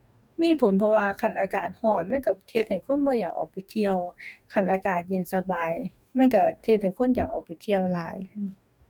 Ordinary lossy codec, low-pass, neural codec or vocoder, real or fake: none; 19.8 kHz; codec, 44.1 kHz, 2.6 kbps, DAC; fake